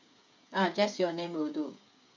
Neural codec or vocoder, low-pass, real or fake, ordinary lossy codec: codec, 16 kHz, 8 kbps, FreqCodec, smaller model; 7.2 kHz; fake; MP3, 64 kbps